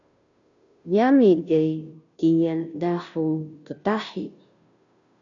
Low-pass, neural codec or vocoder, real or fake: 7.2 kHz; codec, 16 kHz, 0.5 kbps, FunCodec, trained on Chinese and English, 25 frames a second; fake